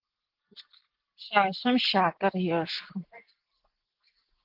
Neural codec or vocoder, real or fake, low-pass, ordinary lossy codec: none; real; 5.4 kHz; Opus, 16 kbps